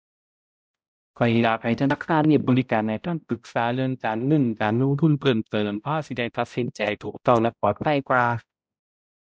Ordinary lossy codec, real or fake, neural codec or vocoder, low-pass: none; fake; codec, 16 kHz, 0.5 kbps, X-Codec, HuBERT features, trained on balanced general audio; none